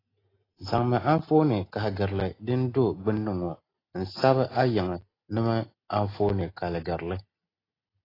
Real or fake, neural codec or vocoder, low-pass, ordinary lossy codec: real; none; 5.4 kHz; AAC, 24 kbps